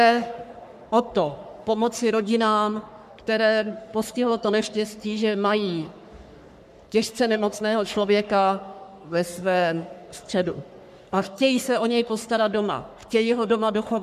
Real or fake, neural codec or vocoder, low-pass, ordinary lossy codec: fake; codec, 44.1 kHz, 3.4 kbps, Pupu-Codec; 14.4 kHz; MP3, 96 kbps